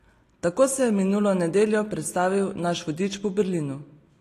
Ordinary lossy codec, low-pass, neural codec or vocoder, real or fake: AAC, 48 kbps; 14.4 kHz; none; real